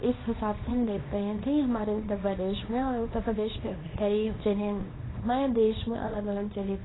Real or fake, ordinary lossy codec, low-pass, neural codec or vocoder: fake; AAC, 16 kbps; 7.2 kHz; codec, 24 kHz, 0.9 kbps, WavTokenizer, small release